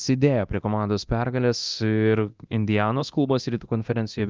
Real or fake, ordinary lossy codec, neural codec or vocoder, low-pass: fake; Opus, 32 kbps; codec, 24 kHz, 0.9 kbps, DualCodec; 7.2 kHz